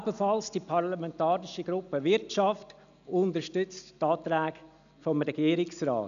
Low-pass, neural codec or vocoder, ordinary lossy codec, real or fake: 7.2 kHz; none; none; real